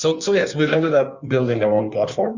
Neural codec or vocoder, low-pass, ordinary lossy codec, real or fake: codec, 16 kHz in and 24 kHz out, 1.1 kbps, FireRedTTS-2 codec; 7.2 kHz; Opus, 64 kbps; fake